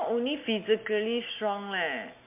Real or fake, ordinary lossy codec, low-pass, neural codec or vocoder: real; MP3, 32 kbps; 3.6 kHz; none